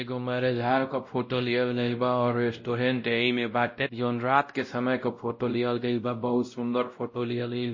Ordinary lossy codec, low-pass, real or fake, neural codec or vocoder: MP3, 32 kbps; 7.2 kHz; fake; codec, 16 kHz, 0.5 kbps, X-Codec, WavLM features, trained on Multilingual LibriSpeech